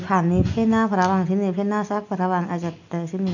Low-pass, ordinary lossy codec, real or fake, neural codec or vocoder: 7.2 kHz; none; real; none